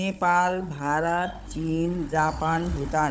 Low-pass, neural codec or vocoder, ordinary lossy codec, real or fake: none; codec, 16 kHz, 16 kbps, FunCodec, trained on Chinese and English, 50 frames a second; none; fake